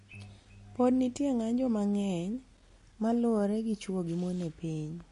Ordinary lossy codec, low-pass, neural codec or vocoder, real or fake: MP3, 48 kbps; 10.8 kHz; none; real